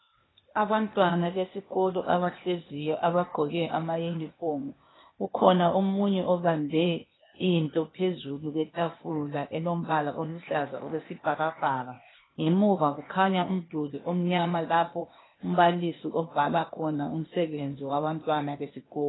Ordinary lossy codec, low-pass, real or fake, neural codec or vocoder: AAC, 16 kbps; 7.2 kHz; fake; codec, 16 kHz, 0.8 kbps, ZipCodec